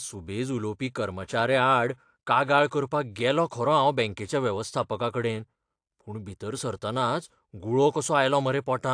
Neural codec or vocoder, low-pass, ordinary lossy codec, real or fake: none; 9.9 kHz; AAC, 64 kbps; real